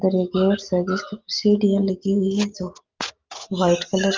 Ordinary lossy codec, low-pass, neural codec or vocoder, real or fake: Opus, 32 kbps; 7.2 kHz; none; real